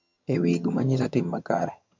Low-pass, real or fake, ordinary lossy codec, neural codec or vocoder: 7.2 kHz; fake; MP3, 48 kbps; vocoder, 22.05 kHz, 80 mel bands, HiFi-GAN